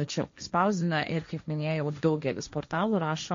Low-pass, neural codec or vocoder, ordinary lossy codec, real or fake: 7.2 kHz; codec, 16 kHz, 1.1 kbps, Voila-Tokenizer; MP3, 48 kbps; fake